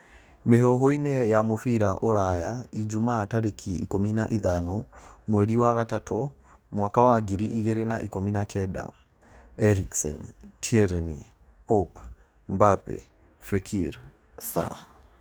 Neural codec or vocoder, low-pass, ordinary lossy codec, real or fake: codec, 44.1 kHz, 2.6 kbps, DAC; none; none; fake